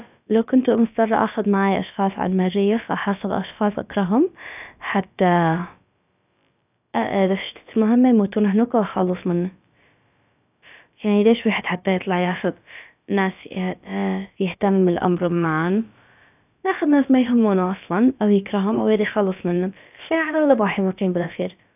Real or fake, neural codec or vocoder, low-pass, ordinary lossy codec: fake; codec, 16 kHz, about 1 kbps, DyCAST, with the encoder's durations; 3.6 kHz; none